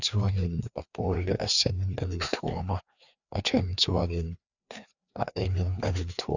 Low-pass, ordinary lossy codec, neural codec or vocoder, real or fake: 7.2 kHz; none; codec, 16 kHz, 2 kbps, FreqCodec, larger model; fake